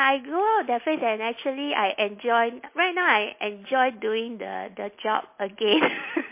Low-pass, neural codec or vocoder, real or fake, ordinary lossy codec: 3.6 kHz; none; real; MP3, 24 kbps